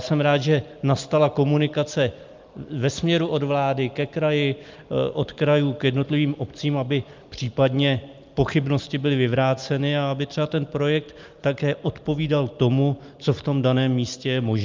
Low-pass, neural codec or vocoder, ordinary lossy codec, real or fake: 7.2 kHz; none; Opus, 24 kbps; real